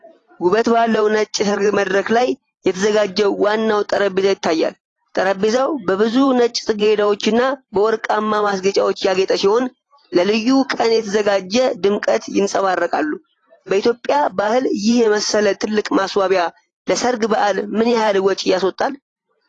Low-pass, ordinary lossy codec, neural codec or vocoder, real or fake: 10.8 kHz; AAC, 32 kbps; vocoder, 44.1 kHz, 128 mel bands every 512 samples, BigVGAN v2; fake